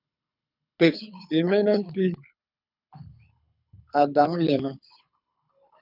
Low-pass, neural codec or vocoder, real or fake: 5.4 kHz; codec, 24 kHz, 6 kbps, HILCodec; fake